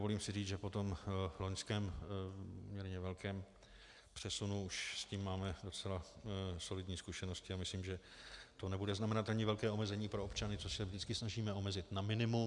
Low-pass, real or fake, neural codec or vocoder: 10.8 kHz; real; none